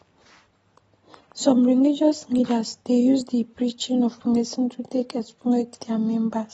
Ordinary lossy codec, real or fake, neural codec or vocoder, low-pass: AAC, 24 kbps; real; none; 19.8 kHz